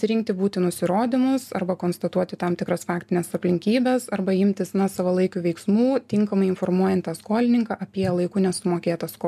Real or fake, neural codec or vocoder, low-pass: real; none; 14.4 kHz